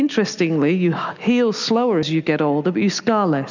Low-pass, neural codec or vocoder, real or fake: 7.2 kHz; none; real